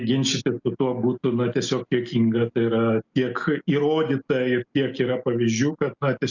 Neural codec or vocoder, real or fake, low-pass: none; real; 7.2 kHz